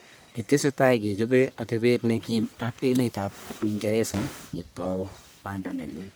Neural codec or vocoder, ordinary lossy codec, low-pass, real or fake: codec, 44.1 kHz, 1.7 kbps, Pupu-Codec; none; none; fake